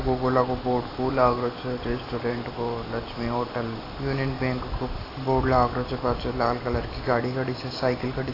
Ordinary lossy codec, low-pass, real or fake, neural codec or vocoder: AAC, 24 kbps; 5.4 kHz; real; none